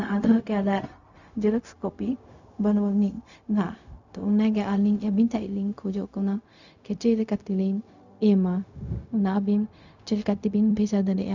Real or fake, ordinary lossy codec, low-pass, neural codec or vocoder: fake; none; 7.2 kHz; codec, 16 kHz, 0.4 kbps, LongCat-Audio-Codec